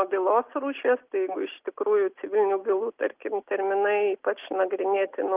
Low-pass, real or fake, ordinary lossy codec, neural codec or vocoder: 3.6 kHz; real; Opus, 32 kbps; none